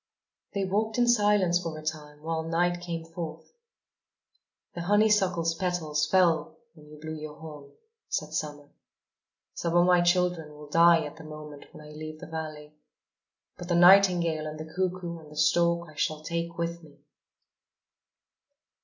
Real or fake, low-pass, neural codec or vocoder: real; 7.2 kHz; none